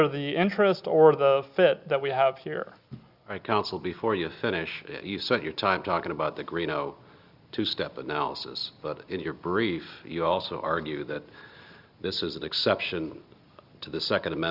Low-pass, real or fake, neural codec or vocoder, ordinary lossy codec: 5.4 kHz; real; none; Opus, 64 kbps